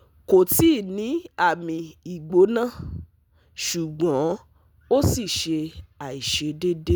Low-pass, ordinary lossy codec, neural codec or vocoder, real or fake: none; none; none; real